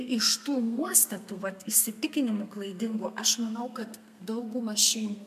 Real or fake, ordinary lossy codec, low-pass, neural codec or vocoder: fake; MP3, 96 kbps; 14.4 kHz; codec, 32 kHz, 1.9 kbps, SNAC